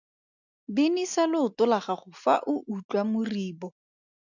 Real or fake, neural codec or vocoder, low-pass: real; none; 7.2 kHz